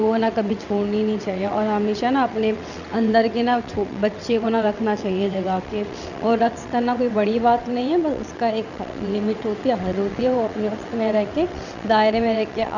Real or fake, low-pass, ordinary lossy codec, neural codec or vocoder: fake; 7.2 kHz; none; vocoder, 22.05 kHz, 80 mel bands, WaveNeXt